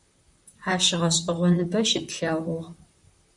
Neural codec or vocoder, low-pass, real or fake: vocoder, 44.1 kHz, 128 mel bands, Pupu-Vocoder; 10.8 kHz; fake